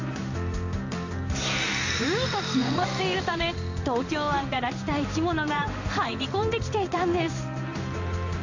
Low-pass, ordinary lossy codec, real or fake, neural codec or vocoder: 7.2 kHz; AAC, 48 kbps; fake; codec, 16 kHz in and 24 kHz out, 1 kbps, XY-Tokenizer